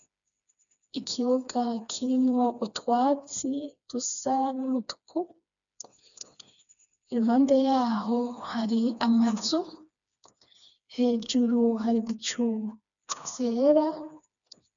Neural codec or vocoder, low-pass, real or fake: codec, 16 kHz, 2 kbps, FreqCodec, smaller model; 7.2 kHz; fake